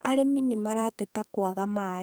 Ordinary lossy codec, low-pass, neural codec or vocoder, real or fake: none; none; codec, 44.1 kHz, 2.6 kbps, SNAC; fake